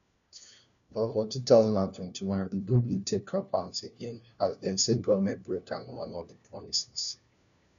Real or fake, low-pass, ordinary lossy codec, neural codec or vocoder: fake; 7.2 kHz; none; codec, 16 kHz, 1 kbps, FunCodec, trained on LibriTTS, 50 frames a second